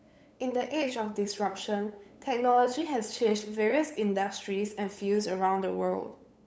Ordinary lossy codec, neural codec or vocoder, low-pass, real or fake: none; codec, 16 kHz, 8 kbps, FunCodec, trained on LibriTTS, 25 frames a second; none; fake